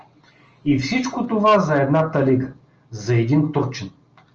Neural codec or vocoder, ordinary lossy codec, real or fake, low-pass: none; Opus, 24 kbps; real; 7.2 kHz